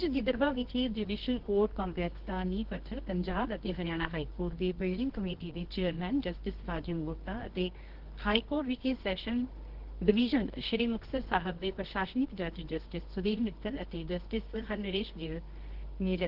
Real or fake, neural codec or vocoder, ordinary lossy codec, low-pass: fake; codec, 24 kHz, 0.9 kbps, WavTokenizer, medium music audio release; Opus, 16 kbps; 5.4 kHz